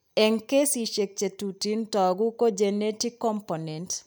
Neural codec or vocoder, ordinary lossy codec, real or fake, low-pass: none; none; real; none